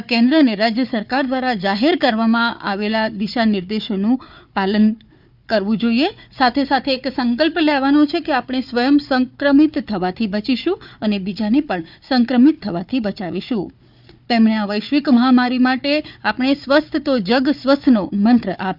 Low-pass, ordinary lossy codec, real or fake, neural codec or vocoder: 5.4 kHz; none; fake; codec, 16 kHz, 4 kbps, FunCodec, trained on Chinese and English, 50 frames a second